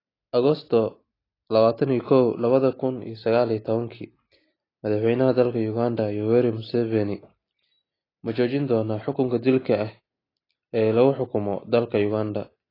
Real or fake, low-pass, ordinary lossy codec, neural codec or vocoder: real; 5.4 kHz; AAC, 24 kbps; none